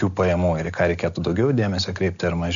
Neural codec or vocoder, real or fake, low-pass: none; real; 7.2 kHz